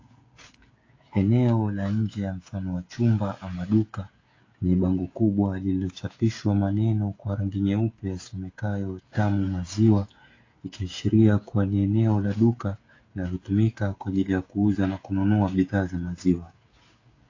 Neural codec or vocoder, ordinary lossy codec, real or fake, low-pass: codec, 16 kHz, 16 kbps, FreqCodec, smaller model; AAC, 32 kbps; fake; 7.2 kHz